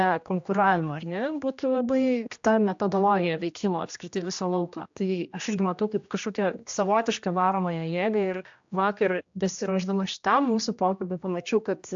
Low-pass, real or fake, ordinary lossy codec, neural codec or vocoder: 7.2 kHz; fake; AAC, 64 kbps; codec, 16 kHz, 1 kbps, X-Codec, HuBERT features, trained on general audio